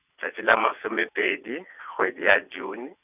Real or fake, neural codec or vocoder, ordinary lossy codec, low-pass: fake; vocoder, 22.05 kHz, 80 mel bands, WaveNeXt; none; 3.6 kHz